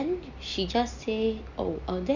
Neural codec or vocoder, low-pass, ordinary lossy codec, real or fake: none; 7.2 kHz; none; real